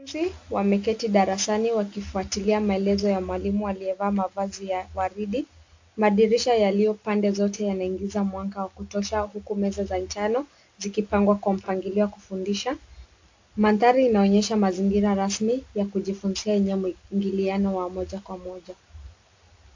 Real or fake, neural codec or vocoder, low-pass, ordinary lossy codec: real; none; 7.2 kHz; AAC, 48 kbps